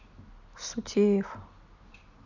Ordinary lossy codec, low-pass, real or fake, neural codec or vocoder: none; 7.2 kHz; real; none